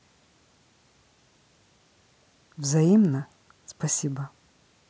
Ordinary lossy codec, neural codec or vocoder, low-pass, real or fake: none; none; none; real